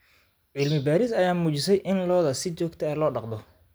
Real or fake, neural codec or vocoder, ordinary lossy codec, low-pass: real; none; none; none